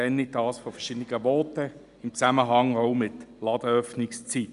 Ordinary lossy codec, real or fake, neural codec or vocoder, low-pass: none; real; none; 10.8 kHz